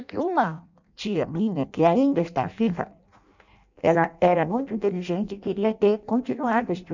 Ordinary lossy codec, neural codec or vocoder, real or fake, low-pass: none; codec, 16 kHz in and 24 kHz out, 0.6 kbps, FireRedTTS-2 codec; fake; 7.2 kHz